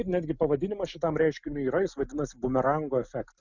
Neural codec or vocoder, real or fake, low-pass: none; real; 7.2 kHz